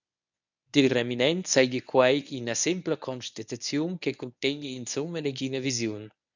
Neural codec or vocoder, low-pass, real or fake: codec, 24 kHz, 0.9 kbps, WavTokenizer, medium speech release version 1; 7.2 kHz; fake